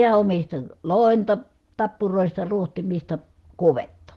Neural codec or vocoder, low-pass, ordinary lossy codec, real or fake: none; 14.4 kHz; Opus, 16 kbps; real